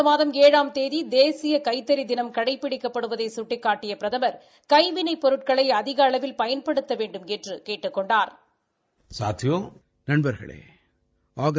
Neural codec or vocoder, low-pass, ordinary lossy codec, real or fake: none; none; none; real